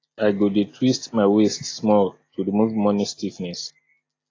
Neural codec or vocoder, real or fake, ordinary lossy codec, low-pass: none; real; AAC, 32 kbps; 7.2 kHz